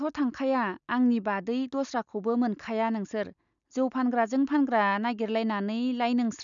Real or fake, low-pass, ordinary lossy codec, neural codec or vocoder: real; 7.2 kHz; none; none